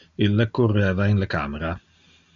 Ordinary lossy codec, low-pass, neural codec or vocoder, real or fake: AAC, 64 kbps; 7.2 kHz; none; real